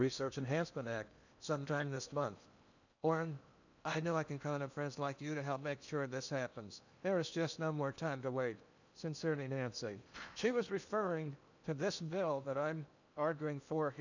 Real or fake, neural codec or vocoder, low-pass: fake; codec, 16 kHz in and 24 kHz out, 0.8 kbps, FocalCodec, streaming, 65536 codes; 7.2 kHz